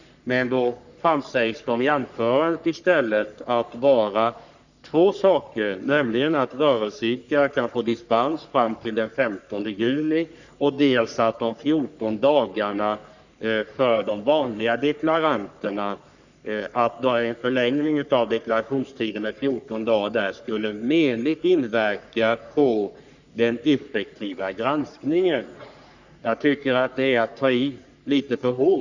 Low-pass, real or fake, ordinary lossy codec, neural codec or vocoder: 7.2 kHz; fake; none; codec, 44.1 kHz, 3.4 kbps, Pupu-Codec